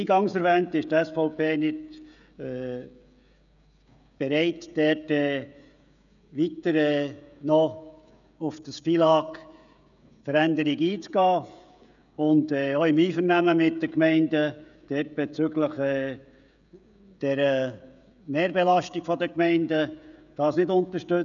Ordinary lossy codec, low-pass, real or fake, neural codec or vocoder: none; 7.2 kHz; fake; codec, 16 kHz, 16 kbps, FreqCodec, smaller model